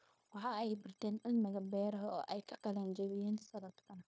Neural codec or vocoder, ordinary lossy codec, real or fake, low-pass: codec, 16 kHz, 0.9 kbps, LongCat-Audio-Codec; none; fake; none